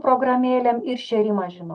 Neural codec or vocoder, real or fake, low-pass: none; real; 9.9 kHz